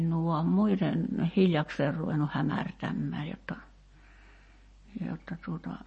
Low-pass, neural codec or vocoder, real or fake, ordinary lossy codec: 10.8 kHz; none; real; MP3, 32 kbps